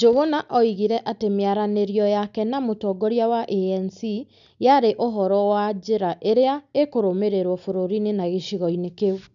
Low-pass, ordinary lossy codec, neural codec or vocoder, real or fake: 7.2 kHz; none; none; real